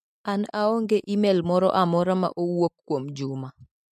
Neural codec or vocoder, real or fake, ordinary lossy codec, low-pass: none; real; MP3, 64 kbps; 14.4 kHz